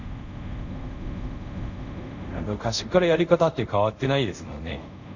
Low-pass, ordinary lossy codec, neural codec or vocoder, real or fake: 7.2 kHz; none; codec, 24 kHz, 0.5 kbps, DualCodec; fake